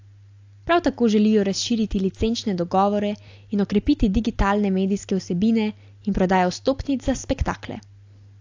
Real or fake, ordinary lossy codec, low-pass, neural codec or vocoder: real; AAC, 48 kbps; 7.2 kHz; none